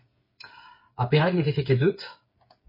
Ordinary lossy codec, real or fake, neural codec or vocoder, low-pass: MP3, 24 kbps; fake; codec, 16 kHz, 8 kbps, FreqCodec, smaller model; 5.4 kHz